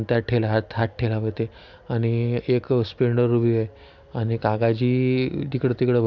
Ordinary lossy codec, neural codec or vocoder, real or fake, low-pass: none; none; real; 7.2 kHz